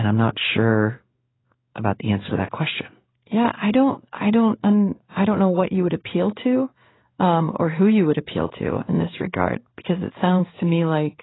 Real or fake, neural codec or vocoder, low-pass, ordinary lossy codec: fake; codec, 16 kHz in and 24 kHz out, 1 kbps, XY-Tokenizer; 7.2 kHz; AAC, 16 kbps